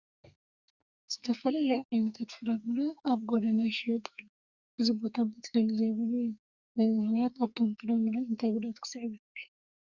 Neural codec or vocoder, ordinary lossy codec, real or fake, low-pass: codec, 44.1 kHz, 2.6 kbps, SNAC; Opus, 64 kbps; fake; 7.2 kHz